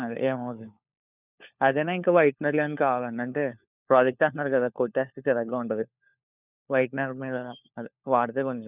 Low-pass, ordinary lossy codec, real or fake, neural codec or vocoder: 3.6 kHz; none; fake; codec, 16 kHz, 4 kbps, FunCodec, trained on LibriTTS, 50 frames a second